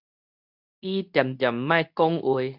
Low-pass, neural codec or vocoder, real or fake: 5.4 kHz; codec, 24 kHz, 0.9 kbps, WavTokenizer, medium speech release version 2; fake